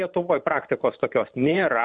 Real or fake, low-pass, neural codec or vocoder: fake; 9.9 kHz; vocoder, 44.1 kHz, 128 mel bands every 512 samples, BigVGAN v2